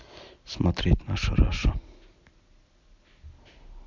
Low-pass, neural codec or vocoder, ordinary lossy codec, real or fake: 7.2 kHz; none; MP3, 64 kbps; real